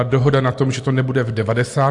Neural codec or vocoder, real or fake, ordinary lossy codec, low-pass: none; real; AAC, 64 kbps; 10.8 kHz